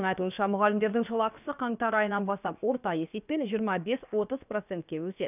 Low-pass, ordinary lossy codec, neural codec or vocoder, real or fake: 3.6 kHz; none; codec, 16 kHz, about 1 kbps, DyCAST, with the encoder's durations; fake